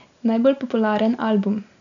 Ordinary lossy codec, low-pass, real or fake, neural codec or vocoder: none; 7.2 kHz; real; none